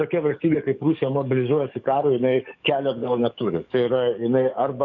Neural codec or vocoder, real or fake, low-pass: codec, 44.1 kHz, 7.8 kbps, DAC; fake; 7.2 kHz